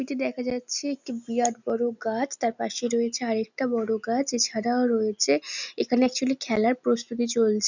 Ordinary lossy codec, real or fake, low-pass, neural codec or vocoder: none; real; 7.2 kHz; none